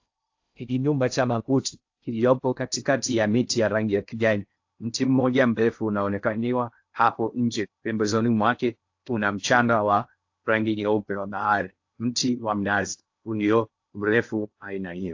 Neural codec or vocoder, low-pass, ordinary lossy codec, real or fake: codec, 16 kHz in and 24 kHz out, 0.6 kbps, FocalCodec, streaming, 2048 codes; 7.2 kHz; AAC, 48 kbps; fake